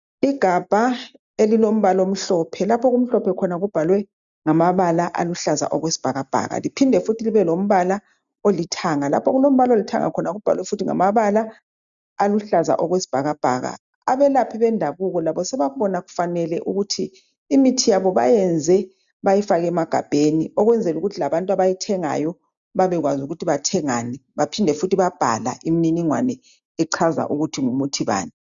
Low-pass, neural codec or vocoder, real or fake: 7.2 kHz; none; real